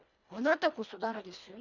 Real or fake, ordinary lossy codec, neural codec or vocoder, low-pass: fake; none; codec, 24 kHz, 1.5 kbps, HILCodec; 7.2 kHz